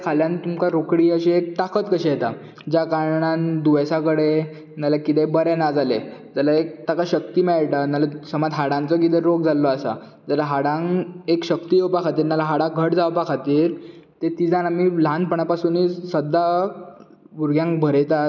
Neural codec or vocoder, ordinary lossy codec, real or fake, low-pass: none; none; real; 7.2 kHz